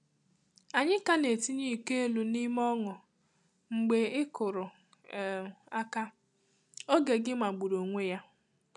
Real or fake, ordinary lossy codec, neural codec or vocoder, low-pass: real; none; none; 10.8 kHz